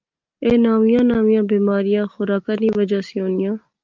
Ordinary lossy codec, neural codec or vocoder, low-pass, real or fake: Opus, 24 kbps; none; 7.2 kHz; real